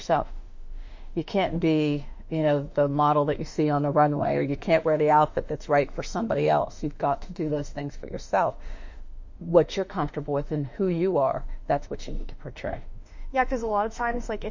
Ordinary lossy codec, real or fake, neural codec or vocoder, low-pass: MP3, 48 kbps; fake; autoencoder, 48 kHz, 32 numbers a frame, DAC-VAE, trained on Japanese speech; 7.2 kHz